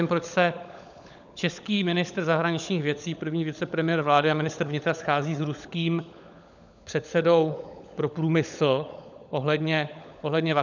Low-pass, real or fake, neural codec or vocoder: 7.2 kHz; fake; codec, 16 kHz, 16 kbps, FunCodec, trained on LibriTTS, 50 frames a second